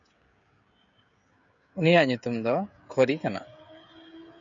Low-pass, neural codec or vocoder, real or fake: 7.2 kHz; codec, 16 kHz, 16 kbps, FreqCodec, smaller model; fake